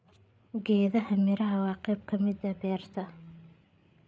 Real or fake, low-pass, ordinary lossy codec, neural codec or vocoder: fake; none; none; codec, 16 kHz, 8 kbps, FreqCodec, larger model